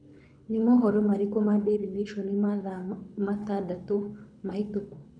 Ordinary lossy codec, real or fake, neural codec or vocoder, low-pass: none; fake; codec, 24 kHz, 6 kbps, HILCodec; 9.9 kHz